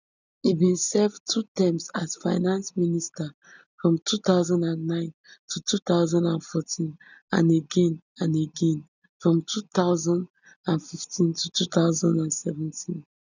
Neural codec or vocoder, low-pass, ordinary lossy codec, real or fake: none; 7.2 kHz; none; real